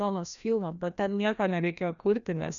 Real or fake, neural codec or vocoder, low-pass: fake; codec, 16 kHz, 1 kbps, FreqCodec, larger model; 7.2 kHz